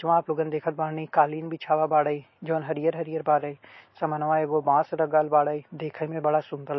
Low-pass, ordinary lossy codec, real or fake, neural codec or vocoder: 7.2 kHz; MP3, 24 kbps; real; none